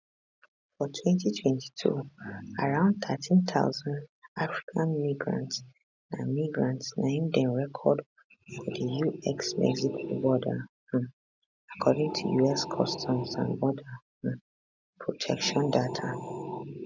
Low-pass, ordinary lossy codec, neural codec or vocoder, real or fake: 7.2 kHz; none; none; real